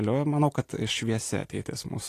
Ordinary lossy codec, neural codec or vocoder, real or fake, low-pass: AAC, 48 kbps; none; real; 14.4 kHz